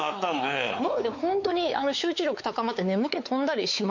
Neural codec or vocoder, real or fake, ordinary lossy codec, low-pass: codec, 16 kHz, 4 kbps, X-Codec, WavLM features, trained on Multilingual LibriSpeech; fake; MP3, 48 kbps; 7.2 kHz